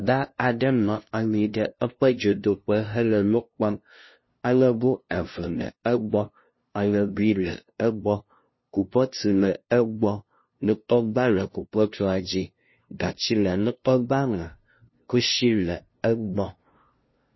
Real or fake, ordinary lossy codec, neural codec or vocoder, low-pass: fake; MP3, 24 kbps; codec, 16 kHz, 0.5 kbps, FunCodec, trained on LibriTTS, 25 frames a second; 7.2 kHz